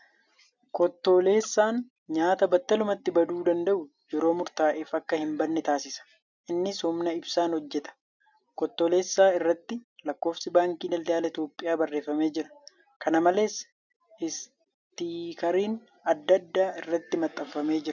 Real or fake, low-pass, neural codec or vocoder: real; 7.2 kHz; none